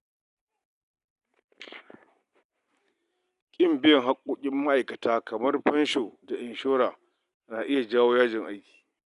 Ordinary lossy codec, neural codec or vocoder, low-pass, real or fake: none; none; 10.8 kHz; real